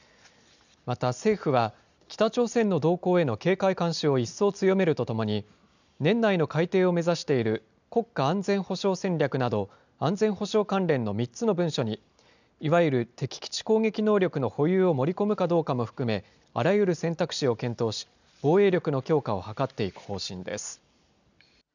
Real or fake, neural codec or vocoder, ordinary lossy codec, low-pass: real; none; none; 7.2 kHz